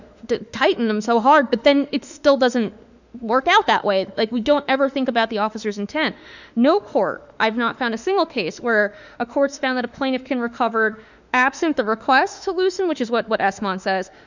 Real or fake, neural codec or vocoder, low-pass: fake; autoencoder, 48 kHz, 32 numbers a frame, DAC-VAE, trained on Japanese speech; 7.2 kHz